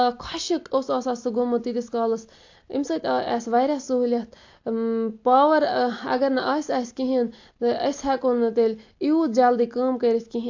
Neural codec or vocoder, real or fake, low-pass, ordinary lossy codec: none; real; 7.2 kHz; AAC, 48 kbps